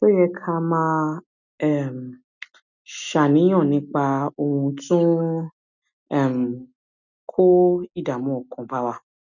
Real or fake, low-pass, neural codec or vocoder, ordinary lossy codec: real; 7.2 kHz; none; none